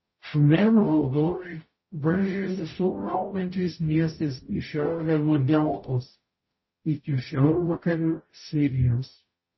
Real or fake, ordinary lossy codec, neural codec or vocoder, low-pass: fake; MP3, 24 kbps; codec, 44.1 kHz, 0.9 kbps, DAC; 7.2 kHz